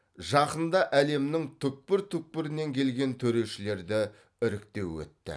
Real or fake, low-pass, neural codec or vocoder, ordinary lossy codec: real; none; none; none